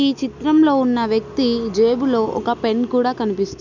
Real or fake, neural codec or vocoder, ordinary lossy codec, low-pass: real; none; none; 7.2 kHz